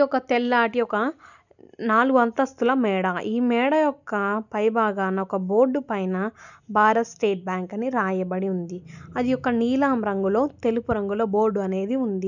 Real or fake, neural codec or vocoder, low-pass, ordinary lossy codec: real; none; 7.2 kHz; none